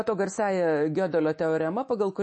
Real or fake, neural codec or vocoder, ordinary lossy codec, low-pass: fake; autoencoder, 48 kHz, 128 numbers a frame, DAC-VAE, trained on Japanese speech; MP3, 32 kbps; 10.8 kHz